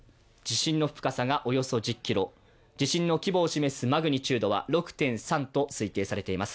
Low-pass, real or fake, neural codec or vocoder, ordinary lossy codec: none; real; none; none